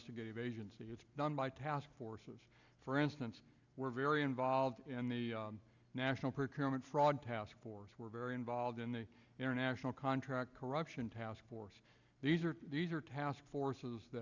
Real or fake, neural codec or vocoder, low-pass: real; none; 7.2 kHz